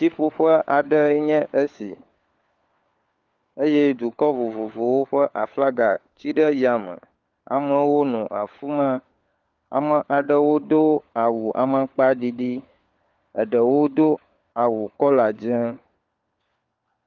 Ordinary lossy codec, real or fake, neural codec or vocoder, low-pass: Opus, 32 kbps; fake; codec, 16 kHz, 4 kbps, FunCodec, trained on LibriTTS, 50 frames a second; 7.2 kHz